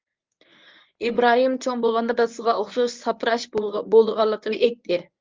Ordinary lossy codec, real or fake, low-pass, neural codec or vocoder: Opus, 24 kbps; fake; 7.2 kHz; codec, 24 kHz, 0.9 kbps, WavTokenizer, medium speech release version 1